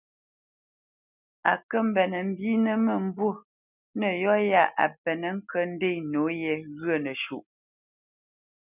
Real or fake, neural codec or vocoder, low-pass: real; none; 3.6 kHz